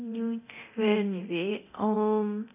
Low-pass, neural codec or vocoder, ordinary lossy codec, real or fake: 3.6 kHz; codec, 24 kHz, 0.9 kbps, DualCodec; none; fake